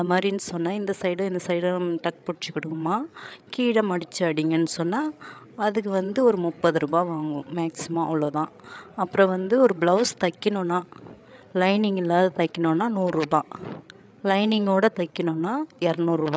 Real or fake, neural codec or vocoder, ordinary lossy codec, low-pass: fake; codec, 16 kHz, 8 kbps, FreqCodec, larger model; none; none